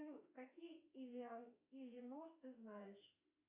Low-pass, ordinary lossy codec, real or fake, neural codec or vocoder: 3.6 kHz; Opus, 64 kbps; fake; autoencoder, 48 kHz, 32 numbers a frame, DAC-VAE, trained on Japanese speech